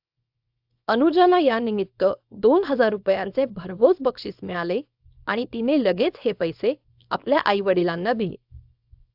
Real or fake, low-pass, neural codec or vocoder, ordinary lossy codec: fake; 5.4 kHz; codec, 24 kHz, 0.9 kbps, WavTokenizer, small release; AAC, 48 kbps